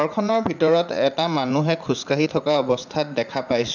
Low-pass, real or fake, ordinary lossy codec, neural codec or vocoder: 7.2 kHz; fake; none; vocoder, 44.1 kHz, 80 mel bands, Vocos